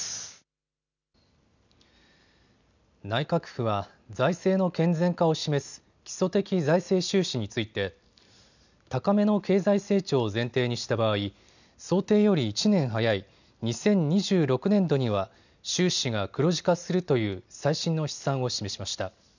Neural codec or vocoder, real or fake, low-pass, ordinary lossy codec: none; real; 7.2 kHz; none